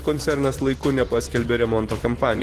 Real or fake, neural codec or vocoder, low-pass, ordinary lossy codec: fake; vocoder, 44.1 kHz, 128 mel bands every 512 samples, BigVGAN v2; 14.4 kHz; Opus, 16 kbps